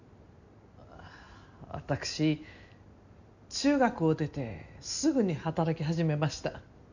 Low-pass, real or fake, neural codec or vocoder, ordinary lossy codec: 7.2 kHz; real; none; none